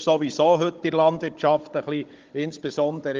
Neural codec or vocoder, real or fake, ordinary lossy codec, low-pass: codec, 16 kHz, 16 kbps, FunCodec, trained on Chinese and English, 50 frames a second; fake; Opus, 32 kbps; 7.2 kHz